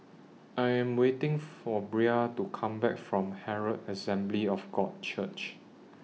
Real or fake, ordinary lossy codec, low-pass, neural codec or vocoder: real; none; none; none